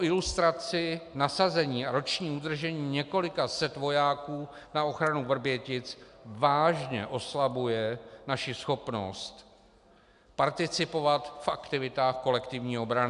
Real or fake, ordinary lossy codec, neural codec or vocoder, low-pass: real; MP3, 96 kbps; none; 10.8 kHz